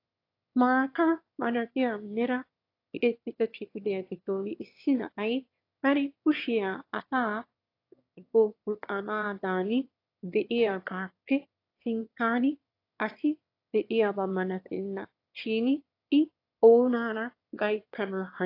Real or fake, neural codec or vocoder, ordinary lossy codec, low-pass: fake; autoencoder, 22.05 kHz, a latent of 192 numbers a frame, VITS, trained on one speaker; AAC, 32 kbps; 5.4 kHz